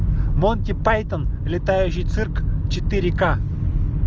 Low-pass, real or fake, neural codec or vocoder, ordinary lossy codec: 7.2 kHz; real; none; Opus, 24 kbps